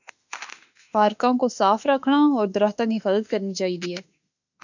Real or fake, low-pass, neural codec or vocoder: fake; 7.2 kHz; autoencoder, 48 kHz, 32 numbers a frame, DAC-VAE, trained on Japanese speech